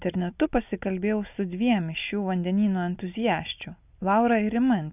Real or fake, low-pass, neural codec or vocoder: real; 3.6 kHz; none